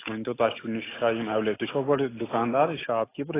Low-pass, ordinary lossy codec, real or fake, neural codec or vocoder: 3.6 kHz; AAC, 16 kbps; real; none